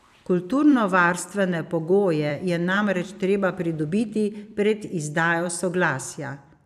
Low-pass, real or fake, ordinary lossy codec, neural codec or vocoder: 14.4 kHz; fake; none; vocoder, 44.1 kHz, 128 mel bands every 256 samples, BigVGAN v2